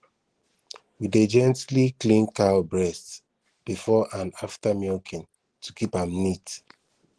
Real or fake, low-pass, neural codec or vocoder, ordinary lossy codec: real; 10.8 kHz; none; Opus, 16 kbps